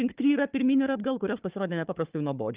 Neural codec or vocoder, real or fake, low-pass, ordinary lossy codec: codec, 16 kHz, 4 kbps, FunCodec, trained on Chinese and English, 50 frames a second; fake; 3.6 kHz; Opus, 32 kbps